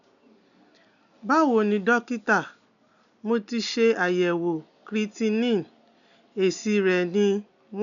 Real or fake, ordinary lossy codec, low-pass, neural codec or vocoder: real; none; 7.2 kHz; none